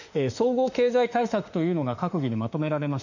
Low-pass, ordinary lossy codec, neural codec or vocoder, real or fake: 7.2 kHz; none; autoencoder, 48 kHz, 32 numbers a frame, DAC-VAE, trained on Japanese speech; fake